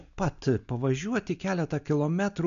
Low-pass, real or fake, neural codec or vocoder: 7.2 kHz; real; none